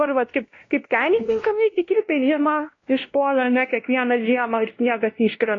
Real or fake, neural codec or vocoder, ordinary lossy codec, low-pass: fake; codec, 16 kHz, 1 kbps, X-Codec, WavLM features, trained on Multilingual LibriSpeech; AAC, 32 kbps; 7.2 kHz